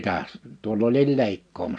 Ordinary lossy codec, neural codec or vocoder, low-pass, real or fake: none; none; 9.9 kHz; real